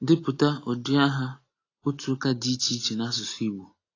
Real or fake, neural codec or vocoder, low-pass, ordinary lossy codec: real; none; 7.2 kHz; AAC, 32 kbps